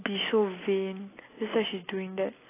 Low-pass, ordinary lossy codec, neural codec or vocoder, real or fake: 3.6 kHz; AAC, 16 kbps; none; real